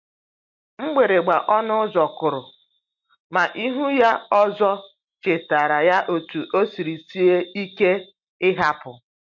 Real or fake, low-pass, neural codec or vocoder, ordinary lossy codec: real; 7.2 kHz; none; MP3, 48 kbps